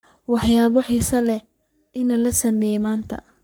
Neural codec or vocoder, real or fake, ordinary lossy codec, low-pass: codec, 44.1 kHz, 3.4 kbps, Pupu-Codec; fake; none; none